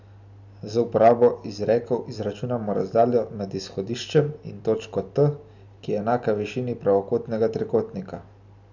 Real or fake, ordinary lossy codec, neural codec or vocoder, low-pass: real; none; none; 7.2 kHz